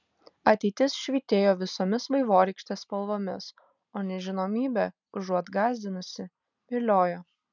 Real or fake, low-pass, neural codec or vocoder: real; 7.2 kHz; none